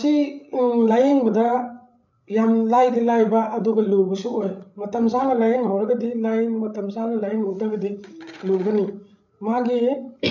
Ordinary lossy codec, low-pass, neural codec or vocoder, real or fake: none; 7.2 kHz; codec, 16 kHz, 8 kbps, FreqCodec, larger model; fake